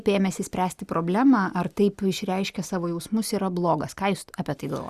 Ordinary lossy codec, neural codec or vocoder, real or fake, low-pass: Opus, 64 kbps; none; real; 14.4 kHz